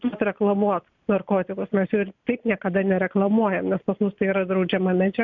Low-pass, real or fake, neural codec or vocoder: 7.2 kHz; real; none